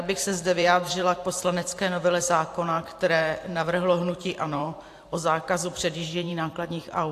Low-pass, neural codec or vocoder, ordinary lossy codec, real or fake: 14.4 kHz; vocoder, 44.1 kHz, 128 mel bands every 512 samples, BigVGAN v2; AAC, 64 kbps; fake